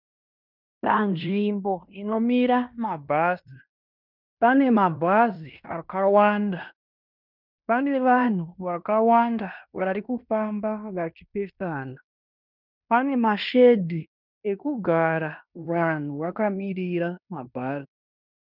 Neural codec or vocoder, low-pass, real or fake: codec, 16 kHz in and 24 kHz out, 0.9 kbps, LongCat-Audio-Codec, fine tuned four codebook decoder; 5.4 kHz; fake